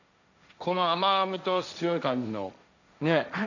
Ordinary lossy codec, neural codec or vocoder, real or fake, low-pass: none; codec, 16 kHz, 1.1 kbps, Voila-Tokenizer; fake; 7.2 kHz